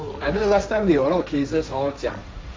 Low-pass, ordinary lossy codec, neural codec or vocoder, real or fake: none; none; codec, 16 kHz, 1.1 kbps, Voila-Tokenizer; fake